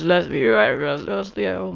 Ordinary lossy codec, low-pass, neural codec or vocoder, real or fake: Opus, 32 kbps; 7.2 kHz; autoencoder, 22.05 kHz, a latent of 192 numbers a frame, VITS, trained on many speakers; fake